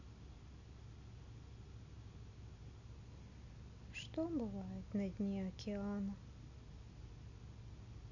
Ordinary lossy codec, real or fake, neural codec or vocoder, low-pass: none; real; none; 7.2 kHz